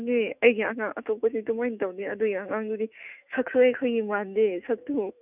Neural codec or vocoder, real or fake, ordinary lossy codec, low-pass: autoencoder, 48 kHz, 128 numbers a frame, DAC-VAE, trained on Japanese speech; fake; none; 3.6 kHz